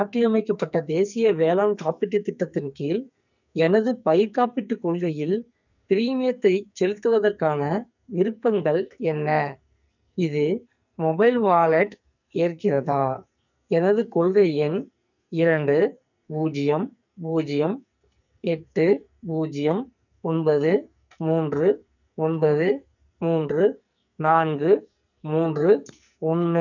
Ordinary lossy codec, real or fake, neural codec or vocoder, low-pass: none; fake; codec, 44.1 kHz, 2.6 kbps, SNAC; 7.2 kHz